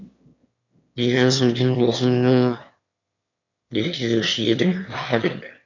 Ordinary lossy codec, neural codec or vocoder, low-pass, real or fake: none; autoencoder, 22.05 kHz, a latent of 192 numbers a frame, VITS, trained on one speaker; 7.2 kHz; fake